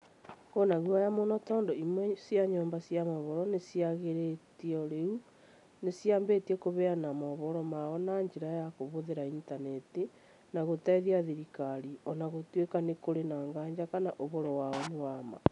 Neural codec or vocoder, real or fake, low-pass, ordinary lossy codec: none; real; 10.8 kHz; none